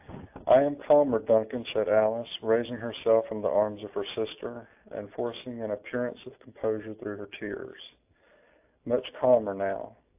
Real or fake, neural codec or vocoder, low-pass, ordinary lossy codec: real; none; 3.6 kHz; AAC, 32 kbps